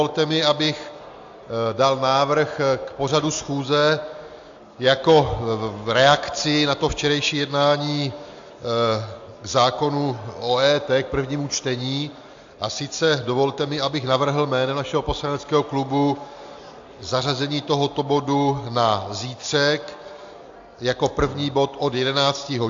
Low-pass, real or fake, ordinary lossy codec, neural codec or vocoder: 7.2 kHz; real; AAC, 64 kbps; none